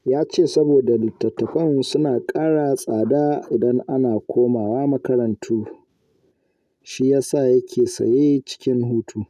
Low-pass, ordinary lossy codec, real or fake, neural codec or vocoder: 14.4 kHz; none; real; none